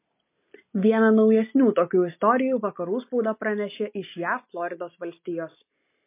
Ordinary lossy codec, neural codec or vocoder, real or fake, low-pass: MP3, 24 kbps; none; real; 3.6 kHz